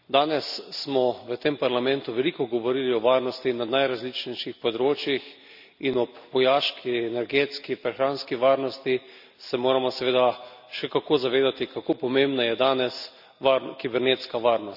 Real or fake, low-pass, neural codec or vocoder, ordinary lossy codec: real; 5.4 kHz; none; none